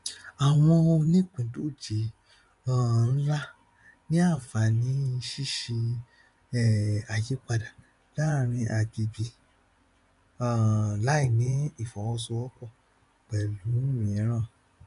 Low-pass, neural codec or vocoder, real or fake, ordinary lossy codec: 10.8 kHz; vocoder, 24 kHz, 100 mel bands, Vocos; fake; none